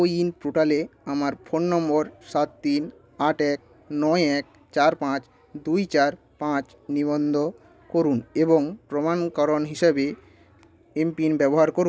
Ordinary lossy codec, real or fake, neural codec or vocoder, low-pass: none; real; none; none